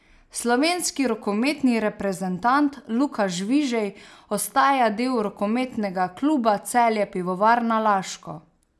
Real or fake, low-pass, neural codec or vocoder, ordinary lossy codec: real; none; none; none